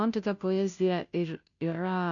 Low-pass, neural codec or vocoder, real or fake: 7.2 kHz; codec, 16 kHz, 0.5 kbps, FunCodec, trained on Chinese and English, 25 frames a second; fake